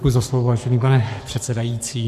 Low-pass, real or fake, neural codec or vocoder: 14.4 kHz; fake; codec, 44.1 kHz, 7.8 kbps, Pupu-Codec